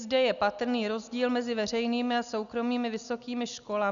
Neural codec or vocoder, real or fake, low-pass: none; real; 7.2 kHz